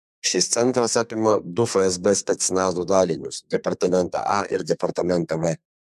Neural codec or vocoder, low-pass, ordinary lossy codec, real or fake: codec, 32 kHz, 1.9 kbps, SNAC; 14.4 kHz; MP3, 96 kbps; fake